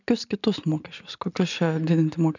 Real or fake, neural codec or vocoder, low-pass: real; none; 7.2 kHz